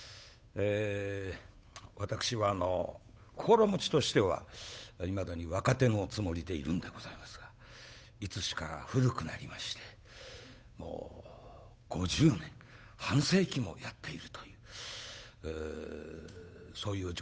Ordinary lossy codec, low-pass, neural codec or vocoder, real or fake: none; none; codec, 16 kHz, 8 kbps, FunCodec, trained on Chinese and English, 25 frames a second; fake